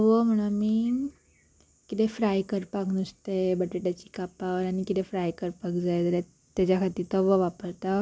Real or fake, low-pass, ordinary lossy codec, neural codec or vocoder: real; none; none; none